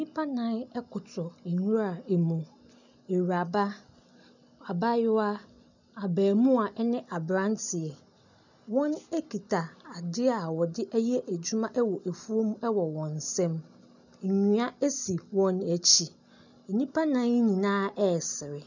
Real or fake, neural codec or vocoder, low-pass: real; none; 7.2 kHz